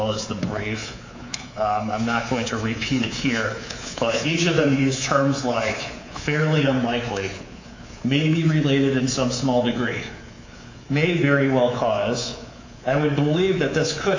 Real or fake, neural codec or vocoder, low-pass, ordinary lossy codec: fake; codec, 24 kHz, 3.1 kbps, DualCodec; 7.2 kHz; AAC, 48 kbps